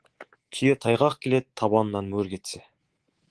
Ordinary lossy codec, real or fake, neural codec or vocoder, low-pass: Opus, 16 kbps; fake; codec, 24 kHz, 3.1 kbps, DualCodec; 10.8 kHz